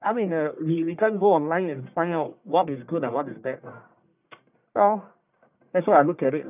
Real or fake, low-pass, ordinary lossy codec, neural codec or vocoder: fake; 3.6 kHz; none; codec, 44.1 kHz, 1.7 kbps, Pupu-Codec